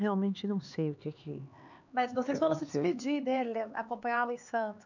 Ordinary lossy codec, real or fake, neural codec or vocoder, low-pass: none; fake; codec, 16 kHz, 4 kbps, X-Codec, HuBERT features, trained on LibriSpeech; 7.2 kHz